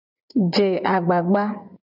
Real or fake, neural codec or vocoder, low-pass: fake; vocoder, 24 kHz, 100 mel bands, Vocos; 5.4 kHz